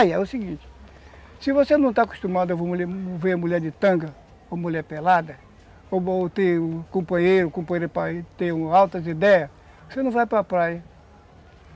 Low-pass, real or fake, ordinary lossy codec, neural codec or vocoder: none; real; none; none